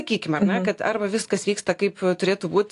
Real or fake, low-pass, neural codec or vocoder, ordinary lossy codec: real; 10.8 kHz; none; AAC, 48 kbps